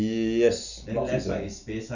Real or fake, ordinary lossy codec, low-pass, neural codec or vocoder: real; none; 7.2 kHz; none